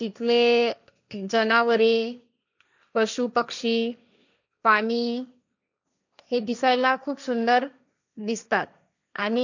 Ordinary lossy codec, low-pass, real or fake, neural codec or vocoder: none; 7.2 kHz; fake; codec, 16 kHz, 1.1 kbps, Voila-Tokenizer